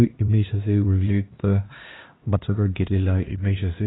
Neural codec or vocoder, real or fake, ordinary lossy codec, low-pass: codec, 16 kHz, 1 kbps, X-Codec, HuBERT features, trained on LibriSpeech; fake; AAC, 16 kbps; 7.2 kHz